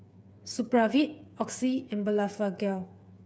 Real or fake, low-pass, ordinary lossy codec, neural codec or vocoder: fake; none; none; codec, 16 kHz, 8 kbps, FreqCodec, smaller model